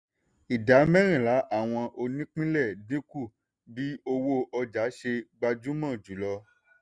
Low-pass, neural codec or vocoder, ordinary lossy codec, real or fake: 9.9 kHz; none; Opus, 64 kbps; real